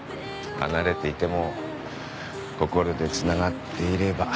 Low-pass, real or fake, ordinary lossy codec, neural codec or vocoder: none; real; none; none